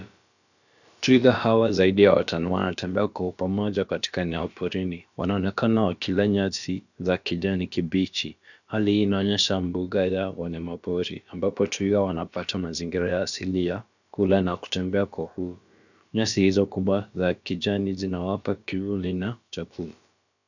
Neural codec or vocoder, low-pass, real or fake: codec, 16 kHz, about 1 kbps, DyCAST, with the encoder's durations; 7.2 kHz; fake